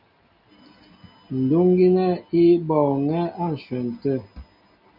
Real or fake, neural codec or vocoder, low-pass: real; none; 5.4 kHz